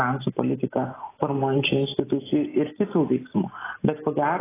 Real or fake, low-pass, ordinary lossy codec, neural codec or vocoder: real; 3.6 kHz; AAC, 16 kbps; none